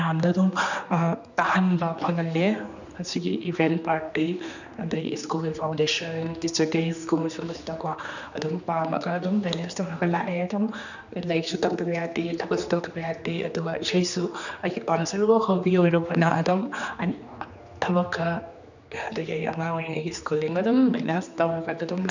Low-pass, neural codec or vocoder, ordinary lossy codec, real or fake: 7.2 kHz; codec, 16 kHz, 2 kbps, X-Codec, HuBERT features, trained on general audio; none; fake